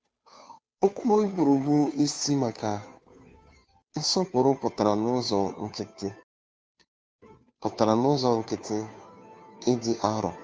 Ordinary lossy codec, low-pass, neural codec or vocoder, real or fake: none; none; codec, 16 kHz, 2 kbps, FunCodec, trained on Chinese and English, 25 frames a second; fake